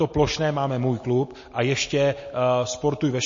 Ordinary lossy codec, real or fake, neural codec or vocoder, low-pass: MP3, 32 kbps; real; none; 7.2 kHz